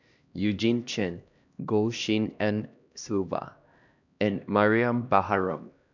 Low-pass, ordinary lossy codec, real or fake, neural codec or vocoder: 7.2 kHz; none; fake; codec, 16 kHz, 1 kbps, X-Codec, HuBERT features, trained on LibriSpeech